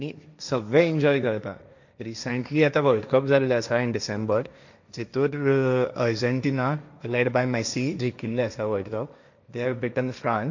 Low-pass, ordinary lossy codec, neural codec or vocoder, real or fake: 7.2 kHz; none; codec, 16 kHz, 1.1 kbps, Voila-Tokenizer; fake